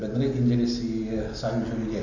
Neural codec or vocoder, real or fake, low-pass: none; real; 7.2 kHz